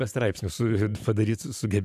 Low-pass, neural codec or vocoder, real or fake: 14.4 kHz; vocoder, 44.1 kHz, 128 mel bands every 512 samples, BigVGAN v2; fake